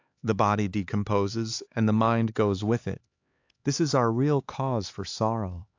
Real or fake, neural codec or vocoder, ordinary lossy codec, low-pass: fake; codec, 16 kHz, 4 kbps, X-Codec, HuBERT features, trained on LibriSpeech; AAC, 48 kbps; 7.2 kHz